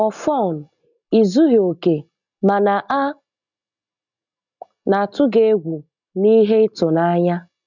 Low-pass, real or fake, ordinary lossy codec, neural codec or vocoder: 7.2 kHz; real; none; none